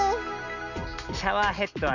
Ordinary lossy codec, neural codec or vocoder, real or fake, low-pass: none; none; real; 7.2 kHz